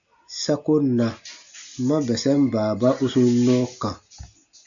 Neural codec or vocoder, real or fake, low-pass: none; real; 7.2 kHz